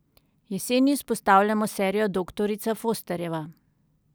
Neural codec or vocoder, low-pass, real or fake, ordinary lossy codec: none; none; real; none